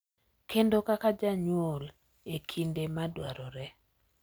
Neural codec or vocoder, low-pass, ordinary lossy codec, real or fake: none; none; none; real